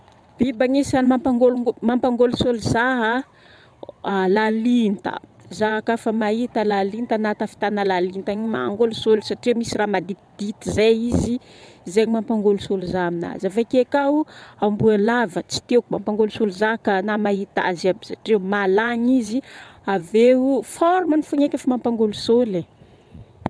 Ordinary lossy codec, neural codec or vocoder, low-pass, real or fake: none; vocoder, 22.05 kHz, 80 mel bands, WaveNeXt; none; fake